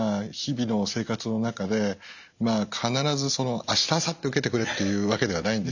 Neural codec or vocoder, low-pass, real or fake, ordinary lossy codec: none; 7.2 kHz; real; none